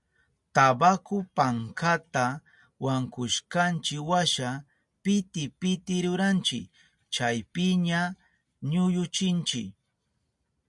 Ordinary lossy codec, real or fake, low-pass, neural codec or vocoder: MP3, 96 kbps; real; 10.8 kHz; none